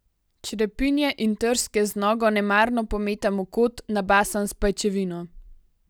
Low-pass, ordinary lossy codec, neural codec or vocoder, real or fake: none; none; none; real